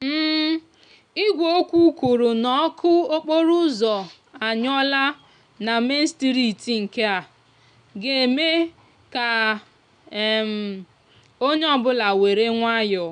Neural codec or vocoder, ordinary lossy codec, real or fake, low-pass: none; none; real; 9.9 kHz